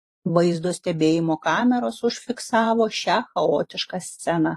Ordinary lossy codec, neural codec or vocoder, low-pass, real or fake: AAC, 48 kbps; vocoder, 44.1 kHz, 128 mel bands every 512 samples, BigVGAN v2; 14.4 kHz; fake